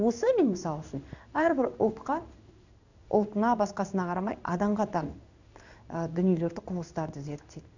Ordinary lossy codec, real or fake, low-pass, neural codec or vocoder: none; fake; 7.2 kHz; codec, 16 kHz in and 24 kHz out, 1 kbps, XY-Tokenizer